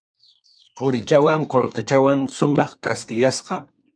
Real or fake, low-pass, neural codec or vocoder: fake; 9.9 kHz; codec, 24 kHz, 1 kbps, SNAC